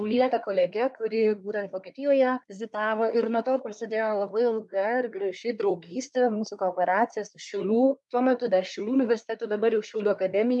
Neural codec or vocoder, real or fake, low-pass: codec, 24 kHz, 1 kbps, SNAC; fake; 10.8 kHz